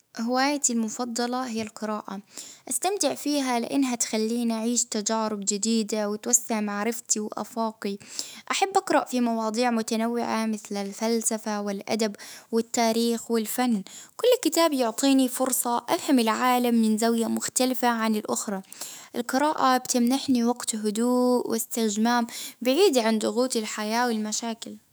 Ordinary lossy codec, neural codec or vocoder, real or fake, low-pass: none; autoencoder, 48 kHz, 128 numbers a frame, DAC-VAE, trained on Japanese speech; fake; none